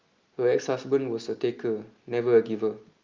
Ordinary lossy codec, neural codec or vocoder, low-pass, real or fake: Opus, 24 kbps; none; 7.2 kHz; real